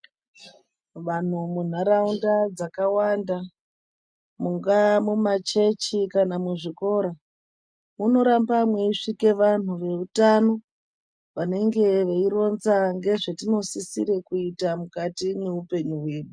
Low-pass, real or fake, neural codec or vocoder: 9.9 kHz; real; none